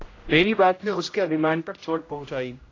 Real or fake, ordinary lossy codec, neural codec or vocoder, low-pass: fake; AAC, 32 kbps; codec, 16 kHz, 0.5 kbps, X-Codec, HuBERT features, trained on general audio; 7.2 kHz